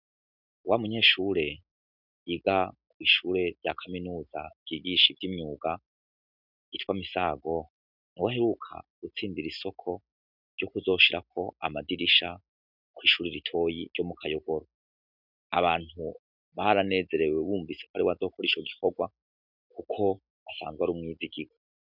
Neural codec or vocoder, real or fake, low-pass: none; real; 5.4 kHz